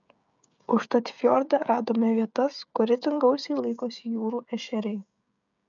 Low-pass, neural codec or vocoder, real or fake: 7.2 kHz; codec, 16 kHz, 16 kbps, FreqCodec, smaller model; fake